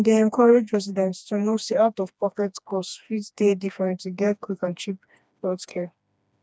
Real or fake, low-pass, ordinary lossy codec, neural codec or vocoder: fake; none; none; codec, 16 kHz, 2 kbps, FreqCodec, smaller model